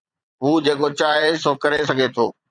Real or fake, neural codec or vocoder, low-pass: fake; vocoder, 22.05 kHz, 80 mel bands, Vocos; 9.9 kHz